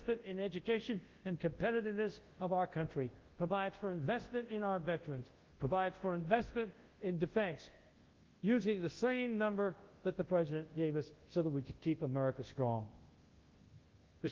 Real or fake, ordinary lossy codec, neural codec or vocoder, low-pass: fake; Opus, 16 kbps; codec, 24 kHz, 0.9 kbps, WavTokenizer, large speech release; 7.2 kHz